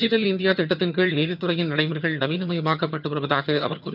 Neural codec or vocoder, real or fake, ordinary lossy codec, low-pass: vocoder, 22.05 kHz, 80 mel bands, HiFi-GAN; fake; none; 5.4 kHz